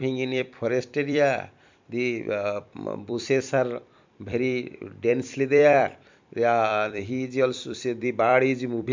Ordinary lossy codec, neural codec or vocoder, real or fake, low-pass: MP3, 64 kbps; vocoder, 44.1 kHz, 128 mel bands every 256 samples, BigVGAN v2; fake; 7.2 kHz